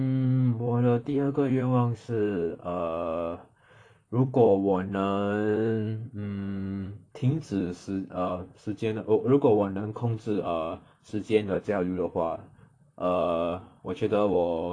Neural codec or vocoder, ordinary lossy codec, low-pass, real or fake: vocoder, 44.1 kHz, 128 mel bands, Pupu-Vocoder; AAC, 48 kbps; 9.9 kHz; fake